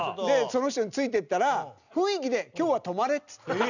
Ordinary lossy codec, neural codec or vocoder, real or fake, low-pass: none; none; real; 7.2 kHz